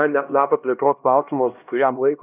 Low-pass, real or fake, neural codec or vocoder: 3.6 kHz; fake; codec, 16 kHz, 1 kbps, X-Codec, HuBERT features, trained on LibriSpeech